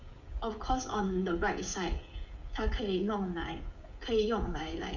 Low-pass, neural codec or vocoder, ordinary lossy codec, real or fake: 7.2 kHz; codec, 16 kHz, 16 kbps, FreqCodec, smaller model; none; fake